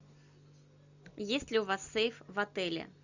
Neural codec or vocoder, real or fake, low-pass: none; real; 7.2 kHz